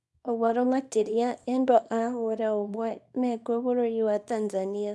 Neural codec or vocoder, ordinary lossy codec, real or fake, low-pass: codec, 24 kHz, 0.9 kbps, WavTokenizer, small release; none; fake; none